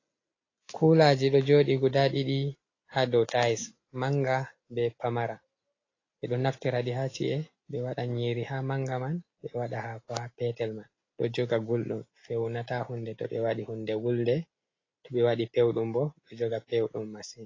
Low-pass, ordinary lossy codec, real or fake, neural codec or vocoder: 7.2 kHz; AAC, 32 kbps; real; none